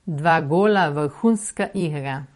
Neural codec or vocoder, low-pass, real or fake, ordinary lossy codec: vocoder, 44.1 kHz, 128 mel bands every 256 samples, BigVGAN v2; 19.8 kHz; fake; MP3, 48 kbps